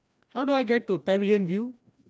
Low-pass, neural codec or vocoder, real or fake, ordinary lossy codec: none; codec, 16 kHz, 1 kbps, FreqCodec, larger model; fake; none